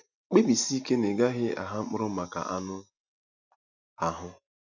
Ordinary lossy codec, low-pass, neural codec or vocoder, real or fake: none; 7.2 kHz; none; real